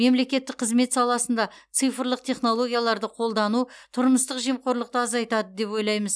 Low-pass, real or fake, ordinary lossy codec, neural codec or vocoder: none; real; none; none